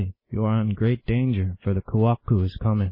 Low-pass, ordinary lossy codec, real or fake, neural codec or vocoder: 5.4 kHz; MP3, 24 kbps; real; none